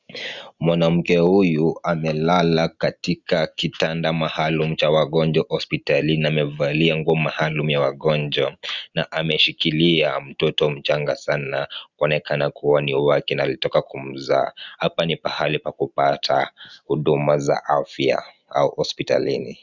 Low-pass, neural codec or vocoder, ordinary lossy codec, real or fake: 7.2 kHz; none; Opus, 64 kbps; real